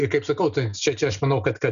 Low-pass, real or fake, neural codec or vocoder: 7.2 kHz; real; none